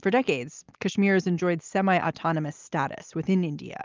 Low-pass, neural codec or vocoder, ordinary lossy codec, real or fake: 7.2 kHz; none; Opus, 24 kbps; real